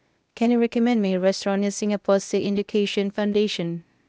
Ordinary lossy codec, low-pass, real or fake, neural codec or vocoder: none; none; fake; codec, 16 kHz, 0.8 kbps, ZipCodec